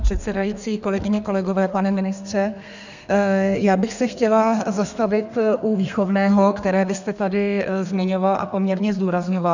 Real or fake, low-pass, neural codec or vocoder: fake; 7.2 kHz; codec, 32 kHz, 1.9 kbps, SNAC